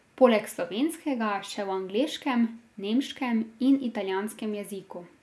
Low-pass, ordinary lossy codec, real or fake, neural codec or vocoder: none; none; real; none